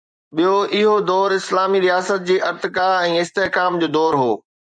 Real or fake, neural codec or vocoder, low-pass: fake; vocoder, 24 kHz, 100 mel bands, Vocos; 9.9 kHz